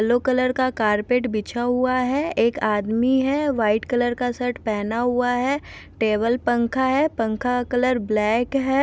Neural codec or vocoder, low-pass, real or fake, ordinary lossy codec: none; none; real; none